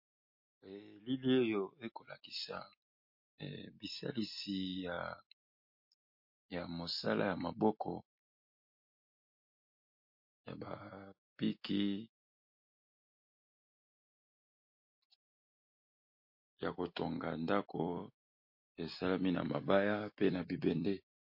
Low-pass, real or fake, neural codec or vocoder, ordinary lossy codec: 5.4 kHz; real; none; MP3, 32 kbps